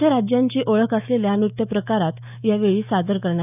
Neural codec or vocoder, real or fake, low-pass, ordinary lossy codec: autoencoder, 48 kHz, 128 numbers a frame, DAC-VAE, trained on Japanese speech; fake; 3.6 kHz; none